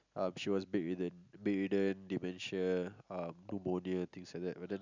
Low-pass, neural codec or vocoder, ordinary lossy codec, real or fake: 7.2 kHz; none; none; real